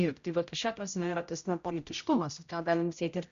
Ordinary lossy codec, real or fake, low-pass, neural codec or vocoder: Opus, 64 kbps; fake; 7.2 kHz; codec, 16 kHz, 0.5 kbps, X-Codec, HuBERT features, trained on general audio